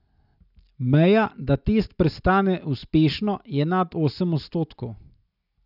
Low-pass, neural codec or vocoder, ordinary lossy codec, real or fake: 5.4 kHz; none; none; real